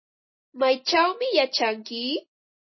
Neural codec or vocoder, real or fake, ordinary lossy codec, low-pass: none; real; MP3, 24 kbps; 7.2 kHz